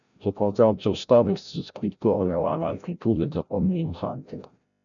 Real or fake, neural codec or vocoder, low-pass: fake; codec, 16 kHz, 0.5 kbps, FreqCodec, larger model; 7.2 kHz